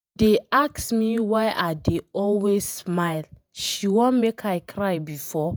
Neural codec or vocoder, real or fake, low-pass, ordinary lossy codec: vocoder, 48 kHz, 128 mel bands, Vocos; fake; none; none